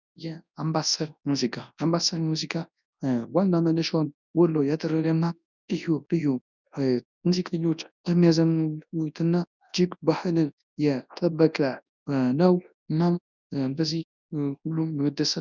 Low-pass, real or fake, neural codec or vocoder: 7.2 kHz; fake; codec, 24 kHz, 0.9 kbps, WavTokenizer, large speech release